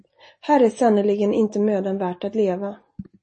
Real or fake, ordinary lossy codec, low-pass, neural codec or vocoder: real; MP3, 32 kbps; 9.9 kHz; none